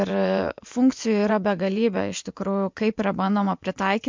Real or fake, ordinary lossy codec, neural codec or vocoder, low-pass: fake; MP3, 64 kbps; vocoder, 24 kHz, 100 mel bands, Vocos; 7.2 kHz